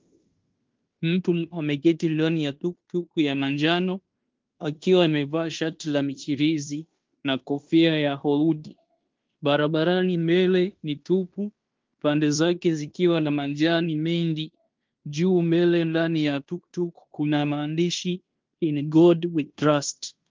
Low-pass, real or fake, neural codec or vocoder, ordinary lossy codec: 7.2 kHz; fake; codec, 16 kHz in and 24 kHz out, 0.9 kbps, LongCat-Audio-Codec, fine tuned four codebook decoder; Opus, 24 kbps